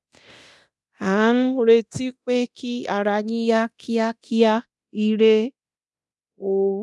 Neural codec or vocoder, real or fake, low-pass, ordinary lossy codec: codec, 24 kHz, 0.9 kbps, DualCodec; fake; none; none